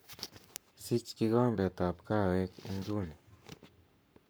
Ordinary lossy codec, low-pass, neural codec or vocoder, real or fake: none; none; codec, 44.1 kHz, 7.8 kbps, Pupu-Codec; fake